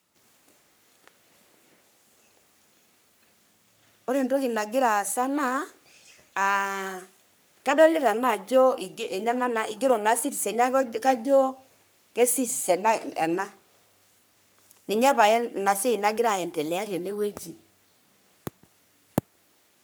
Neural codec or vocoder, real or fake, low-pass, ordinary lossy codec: codec, 44.1 kHz, 3.4 kbps, Pupu-Codec; fake; none; none